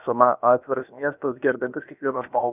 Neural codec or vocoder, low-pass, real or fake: codec, 16 kHz, about 1 kbps, DyCAST, with the encoder's durations; 3.6 kHz; fake